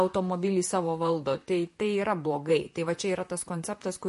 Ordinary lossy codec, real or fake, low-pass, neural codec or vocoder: MP3, 48 kbps; fake; 14.4 kHz; vocoder, 44.1 kHz, 128 mel bands, Pupu-Vocoder